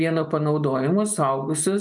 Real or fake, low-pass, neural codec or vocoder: real; 10.8 kHz; none